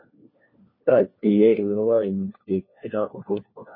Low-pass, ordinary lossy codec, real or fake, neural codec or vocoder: 5.4 kHz; MP3, 24 kbps; fake; codec, 16 kHz, 1 kbps, FunCodec, trained on LibriTTS, 50 frames a second